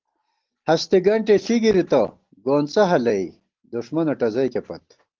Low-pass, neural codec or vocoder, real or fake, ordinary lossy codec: 7.2 kHz; none; real; Opus, 16 kbps